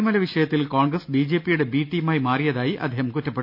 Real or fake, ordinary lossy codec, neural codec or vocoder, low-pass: real; none; none; 5.4 kHz